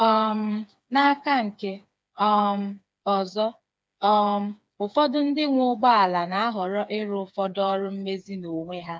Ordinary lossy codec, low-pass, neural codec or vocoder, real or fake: none; none; codec, 16 kHz, 4 kbps, FreqCodec, smaller model; fake